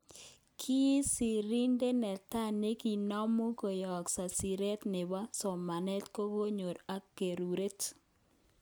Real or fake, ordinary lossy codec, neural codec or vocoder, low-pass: real; none; none; none